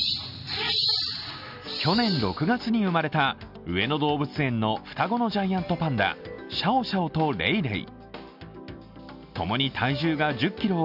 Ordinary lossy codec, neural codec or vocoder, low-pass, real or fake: none; none; 5.4 kHz; real